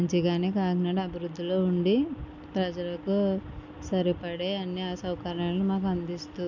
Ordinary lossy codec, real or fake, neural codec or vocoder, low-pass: MP3, 64 kbps; real; none; 7.2 kHz